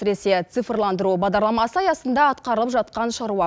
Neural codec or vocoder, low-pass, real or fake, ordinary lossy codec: none; none; real; none